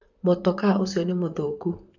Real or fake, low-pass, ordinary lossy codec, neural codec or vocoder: fake; 7.2 kHz; none; vocoder, 44.1 kHz, 128 mel bands, Pupu-Vocoder